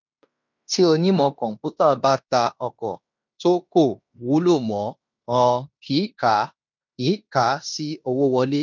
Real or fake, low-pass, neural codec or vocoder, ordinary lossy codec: fake; 7.2 kHz; codec, 16 kHz in and 24 kHz out, 0.9 kbps, LongCat-Audio-Codec, fine tuned four codebook decoder; none